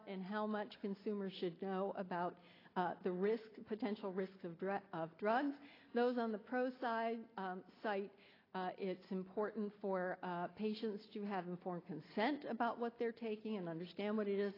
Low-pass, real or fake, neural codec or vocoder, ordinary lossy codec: 5.4 kHz; real; none; AAC, 24 kbps